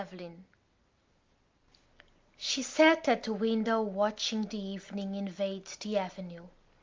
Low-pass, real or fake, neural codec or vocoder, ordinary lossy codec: 7.2 kHz; real; none; Opus, 32 kbps